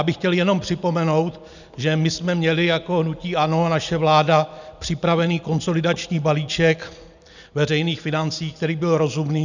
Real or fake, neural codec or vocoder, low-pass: real; none; 7.2 kHz